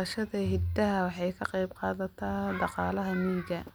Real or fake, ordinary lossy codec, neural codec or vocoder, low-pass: real; none; none; none